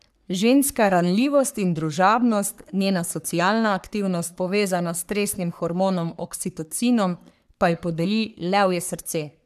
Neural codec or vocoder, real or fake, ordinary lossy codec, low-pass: codec, 44.1 kHz, 3.4 kbps, Pupu-Codec; fake; none; 14.4 kHz